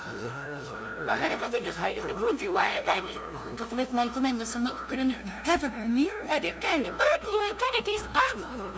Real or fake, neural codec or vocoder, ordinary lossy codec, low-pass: fake; codec, 16 kHz, 0.5 kbps, FunCodec, trained on LibriTTS, 25 frames a second; none; none